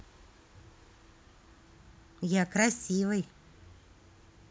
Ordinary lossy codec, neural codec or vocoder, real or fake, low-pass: none; none; real; none